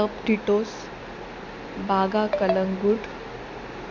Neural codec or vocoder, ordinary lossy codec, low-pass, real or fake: none; none; 7.2 kHz; real